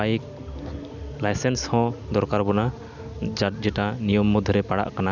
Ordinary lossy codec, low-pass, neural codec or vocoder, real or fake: none; 7.2 kHz; none; real